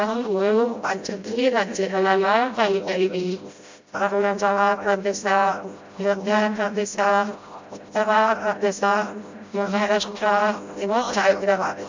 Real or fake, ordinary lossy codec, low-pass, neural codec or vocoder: fake; MP3, 64 kbps; 7.2 kHz; codec, 16 kHz, 0.5 kbps, FreqCodec, smaller model